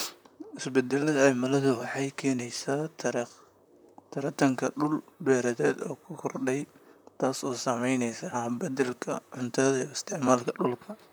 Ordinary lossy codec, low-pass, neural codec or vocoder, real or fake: none; none; vocoder, 44.1 kHz, 128 mel bands, Pupu-Vocoder; fake